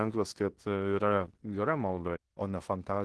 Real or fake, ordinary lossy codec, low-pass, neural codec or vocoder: fake; Opus, 16 kbps; 10.8 kHz; codec, 16 kHz in and 24 kHz out, 0.9 kbps, LongCat-Audio-Codec, fine tuned four codebook decoder